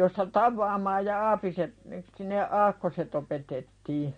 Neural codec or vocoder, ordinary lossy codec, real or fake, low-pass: none; MP3, 48 kbps; real; 9.9 kHz